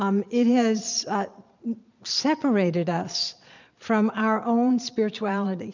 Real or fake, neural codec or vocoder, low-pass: fake; vocoder, 22.05 kHz, 80 mel bands, Vocos; 7.2 kHz